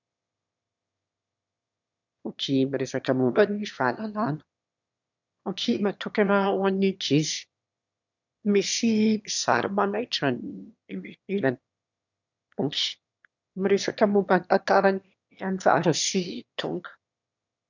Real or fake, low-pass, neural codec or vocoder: fake; 7.2 kHz; autoencoder, 22.05 kHz, a latent of 192 numbers a frame, VITS, trained on one speaker